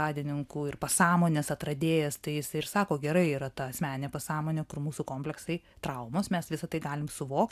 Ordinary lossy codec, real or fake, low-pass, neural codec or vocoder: AAC, 96 kbps; real; 14.4 kHz; none